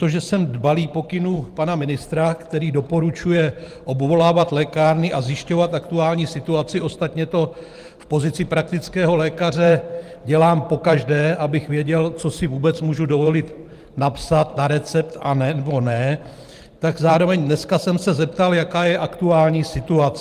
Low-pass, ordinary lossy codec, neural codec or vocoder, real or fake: 14.4 kHz; Opus, 24 kbps; vocoder, 44.1 kHz, 128 mel bands every 512 samples, BigVGAN v2; fake